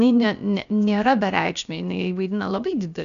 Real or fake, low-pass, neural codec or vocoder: fake; 7.2 kHz; codec, 16 kHz, about 1 kbps, DyCAST, with the encoder's durations